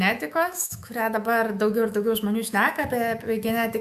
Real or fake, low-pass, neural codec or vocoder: fake; 14.4 kHz; autoencoder, 48 kHz, 128 numbers a frame, DAC-VAE, trained on Japanese speech